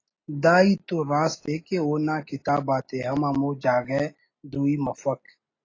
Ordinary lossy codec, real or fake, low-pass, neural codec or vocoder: AAC, 32 kbps; real; 7.2 kHz; none